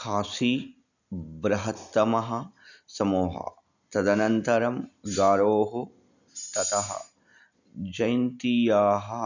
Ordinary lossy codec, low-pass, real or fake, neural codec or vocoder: none; 7.2 kHz; real; none